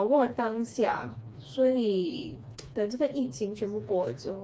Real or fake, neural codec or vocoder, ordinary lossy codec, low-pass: fake; codec, 16 kHz, 2 kbps, FreqCodec, smaller model; none; none